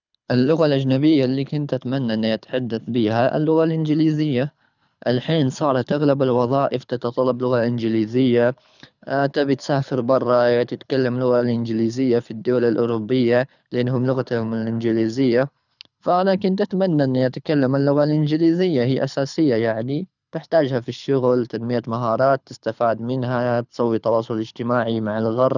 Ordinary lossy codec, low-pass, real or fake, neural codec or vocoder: none; 7.2 kHz; fake; codec, 24 kHz, 6 kbps, HILCodec